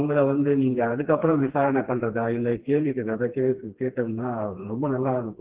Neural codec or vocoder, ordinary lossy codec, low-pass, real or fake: codec, 16 kHz, 2 kbps, FreqCodec, smaller model; Opus, 16 kbps; 3.6 kHz; fake